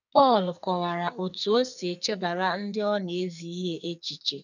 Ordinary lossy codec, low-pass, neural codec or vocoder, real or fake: none; 7.2 kHz; codec, 44.1 kHz, 2.6 kbps, SNAC; fake